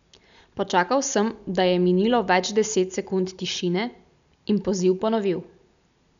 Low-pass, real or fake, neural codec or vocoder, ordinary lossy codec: 7.2 kHz; real; none; none